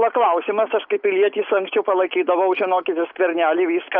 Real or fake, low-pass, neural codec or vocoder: real; 5.4 kHz; none